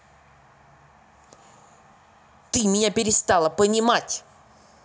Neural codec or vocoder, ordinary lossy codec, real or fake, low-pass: none; none; real; none